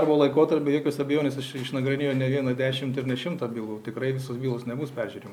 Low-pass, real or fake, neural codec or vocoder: 19.8 kHz; real; none